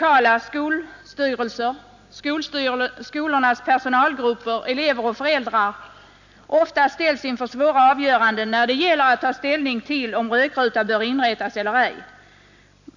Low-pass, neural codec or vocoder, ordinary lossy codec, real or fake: 7.2 kHz; none; none; real